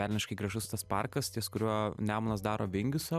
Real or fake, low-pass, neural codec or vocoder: real; 14.4 kHz; none